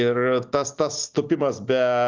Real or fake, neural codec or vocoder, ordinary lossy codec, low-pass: real; none; Opus, 32 kbps; 7.2 kHz